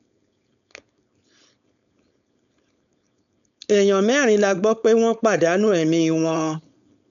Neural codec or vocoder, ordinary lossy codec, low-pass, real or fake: codec, 16 kHz, 4.8 kbps, FACodec; none; 7.2 kHz; fake